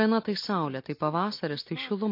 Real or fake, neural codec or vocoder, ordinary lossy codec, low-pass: real; none; MP3, 32 kbps; 5.4 kHz